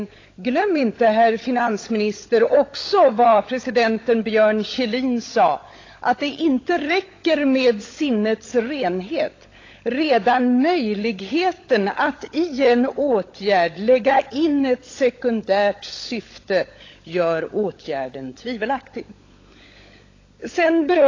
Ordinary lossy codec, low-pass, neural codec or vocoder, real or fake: AAC, 32 kbps; 7.2 kHz; codec, 16 kHz, 16 kbps, FunCodec, trained on LibriTTS, 50 frames a second; fake